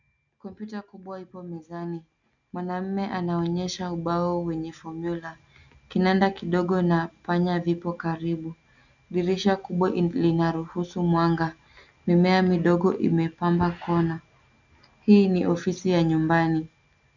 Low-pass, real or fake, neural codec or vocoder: 7.2 kHz; real; none